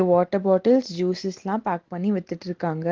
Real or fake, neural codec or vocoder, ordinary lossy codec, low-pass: real; none; Opus, 16 kbps; 7.2 kHz